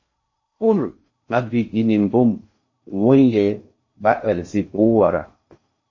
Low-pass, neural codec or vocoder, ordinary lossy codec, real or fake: 7.2 kHz; codec, 16 kHz in and 24 kHz out, 0.6 kbps, FocalCodec, streaming, 4096 codes; MP3, 32 kbps; fake